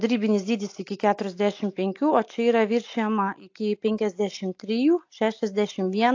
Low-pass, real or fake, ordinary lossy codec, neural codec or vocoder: 7.2 kHz; real; AAC, 48 kbps; none